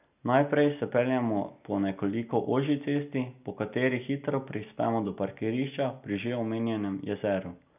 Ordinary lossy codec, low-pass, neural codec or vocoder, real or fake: none; 3.6 kHz; none; real